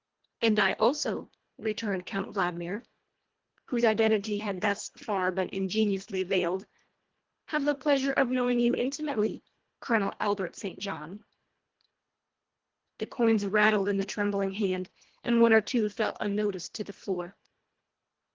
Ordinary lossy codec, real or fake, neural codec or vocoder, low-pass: Opus, 16 kbps; fake; codec, 24 kHz, 1.5 kbps, HILCodec; 7.2 kHz